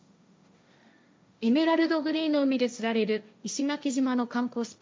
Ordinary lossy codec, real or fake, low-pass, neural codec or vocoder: none; fake; none; codec, 16 kHz, 1.1 kbps, Voila-Tokenizer